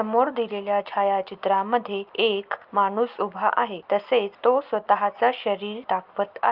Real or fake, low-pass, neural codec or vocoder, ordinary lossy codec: real; 5.4 kHz; none; Opus, 24 kbps